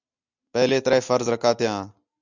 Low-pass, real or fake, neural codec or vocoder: 7.2 kHz; real; none